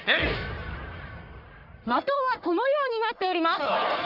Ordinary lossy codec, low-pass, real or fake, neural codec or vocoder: Opus, 32 kbps; 5.4 kHz; fake; codec, 44.1 kHz, 1.7 kbps, Pupu-Codec